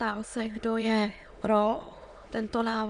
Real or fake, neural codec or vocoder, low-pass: fake; autoencoder, 22.05 kHz, a latent of 192 numbers a frame, VITS, trained on many speakers; 9.9 kHz